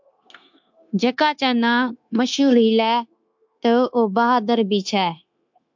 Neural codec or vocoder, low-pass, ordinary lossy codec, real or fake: codec, 16 kHz, 0.9 kbps, LongCat-Audio-Codec; 7.2 kHz; MP3, 64 kbps; fake